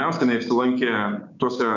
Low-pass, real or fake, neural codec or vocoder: 7.2 kHz; fake; codec, 16 kHz, 6 kbps, DAC